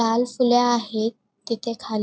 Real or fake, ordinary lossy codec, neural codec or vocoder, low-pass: real; none; none; none